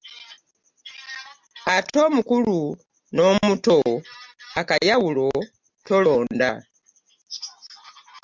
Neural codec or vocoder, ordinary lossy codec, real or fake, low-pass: none; MP3, 64 kbps; real; 7.2 kHz